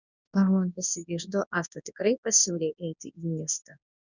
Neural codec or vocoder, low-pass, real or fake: codec, 24 kHz, 0.9 kbps, WavTokenizer, large speech release; 7.2 kHz; fake